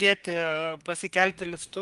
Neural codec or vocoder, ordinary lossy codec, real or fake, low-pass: codec, 24 kHz, 1 kbps, SNAC; Opus, 32 kbps; fake; 10.8 kHz